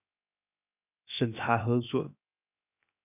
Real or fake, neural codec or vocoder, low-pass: fake; codec, 16 kHz, 0.7 kbps, FocalCodec; 3.6 kHz